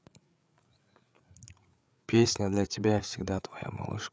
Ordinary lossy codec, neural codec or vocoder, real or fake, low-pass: none; codec, 16 kHz, 8 kbps, FreqCodec, larger model; fake; none